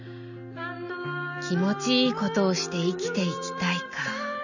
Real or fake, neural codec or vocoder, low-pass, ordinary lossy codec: real; none; 7.2 kHz; none